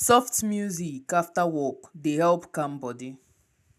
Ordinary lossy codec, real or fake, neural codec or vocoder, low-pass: none; real; none; 14.4 kHz